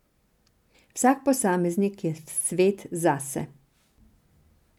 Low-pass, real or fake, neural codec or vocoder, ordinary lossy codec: 19.8 kHz; real; none; none